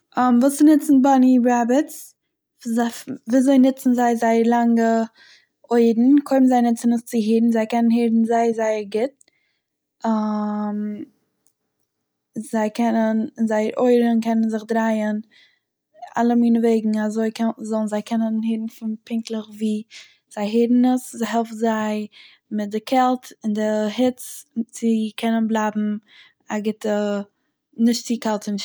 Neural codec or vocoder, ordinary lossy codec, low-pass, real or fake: none; none; none; real